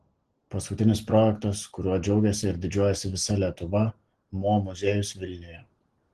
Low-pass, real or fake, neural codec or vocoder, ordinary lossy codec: 10.8 kHz; real; none; Opus, 16 kbps